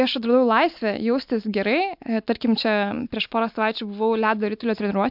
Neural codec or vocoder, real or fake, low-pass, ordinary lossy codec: none; real; 5.4 kHz; MP3, 48 kbps